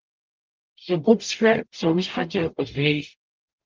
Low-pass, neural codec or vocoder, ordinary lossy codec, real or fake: 7.2 kHz; codec, 44.1 kHz, 0.9 kbps, DAC; Opus, 24 kbps; fake